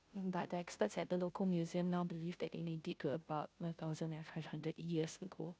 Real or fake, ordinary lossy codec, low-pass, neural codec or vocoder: fake; none; none; codec, 16 kHz, 0.5 kbps, FunCodec, trained on Chinese and English, 25 frames a second